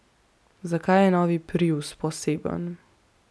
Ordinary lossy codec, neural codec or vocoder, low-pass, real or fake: none; none; none; real